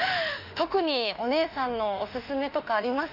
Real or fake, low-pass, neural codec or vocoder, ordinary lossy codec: fake; 5.4 kHz; autoencoder, 48 kHz, 32 numbers a frame, DAC-VAE, trained on Japanese speech; Opus, 64 kbps